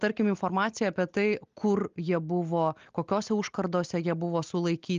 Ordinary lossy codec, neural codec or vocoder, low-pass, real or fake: Opus, 32 kbps; none; 7.2 kHz; real